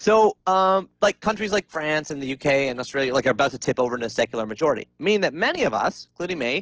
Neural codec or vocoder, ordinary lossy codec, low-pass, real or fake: none; Opus, 16 kbps; 7.2 kHz; real